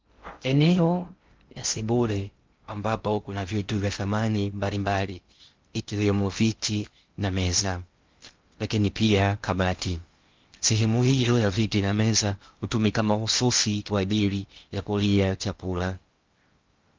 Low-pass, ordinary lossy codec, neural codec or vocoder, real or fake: 7.2 kHz; Opus, 32 kbps; codec, 16 kHz in and 24 kHz out, 0.6 kbps, FocalCodec, streaming, 2048 codes; fake